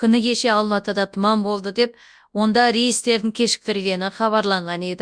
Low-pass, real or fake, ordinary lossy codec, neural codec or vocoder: 9.9 kHz; fake; Opus, 64 kbps; codec, 24 kHz, 0.9 kbps, WavTokenizer, large speech release